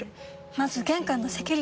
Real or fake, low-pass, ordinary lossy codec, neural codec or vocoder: real; none; none; none